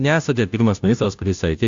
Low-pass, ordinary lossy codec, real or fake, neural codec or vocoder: 7.2 kHz; AAC, 64 kbps; fake; codec, 16 kHz, 0.5 kbps, FunCodec, trained on Chinese and English, 25 frames a second